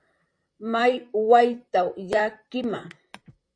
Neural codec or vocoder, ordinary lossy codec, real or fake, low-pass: vocoder, 44.1 kHz, 128 mel bands, Pupu-Vocoder; AAC, 48 kbps; fake; 9.9 kHz